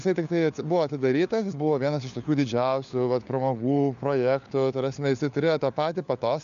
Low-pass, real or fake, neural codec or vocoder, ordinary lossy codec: 7.2 kHz; fake; codec, 16 kHz, 4 kbps, FunCodec, trained on LibriTTS, 50 frames a second; MP3, 96 kbps